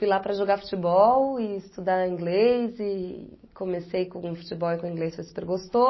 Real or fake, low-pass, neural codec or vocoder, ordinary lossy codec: real; 7.2 kHz; none; MP3, 24 kbps